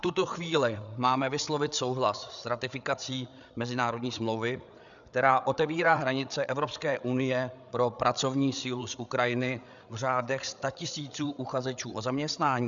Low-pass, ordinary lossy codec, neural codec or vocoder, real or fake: 7.2 kHz; MP3, 96 kbps; codec, 16 kHz, 8 kbps, FreqCodec, larger model; fake